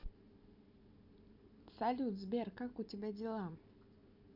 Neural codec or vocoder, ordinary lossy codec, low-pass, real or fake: none; none; 5.4 kHz; real